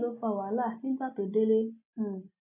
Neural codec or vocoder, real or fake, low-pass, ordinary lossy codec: none; real; 3.6 kHz; none